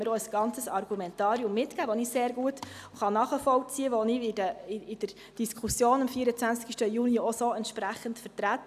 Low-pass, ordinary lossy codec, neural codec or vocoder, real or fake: 14.4 kHz; none; none; real